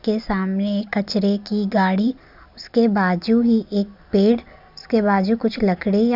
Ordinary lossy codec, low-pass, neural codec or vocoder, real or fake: none; 5.4 kHz; none; real